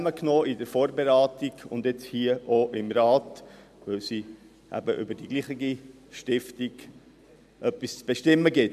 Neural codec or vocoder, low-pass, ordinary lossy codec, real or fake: none; 14.4 kHz; none; real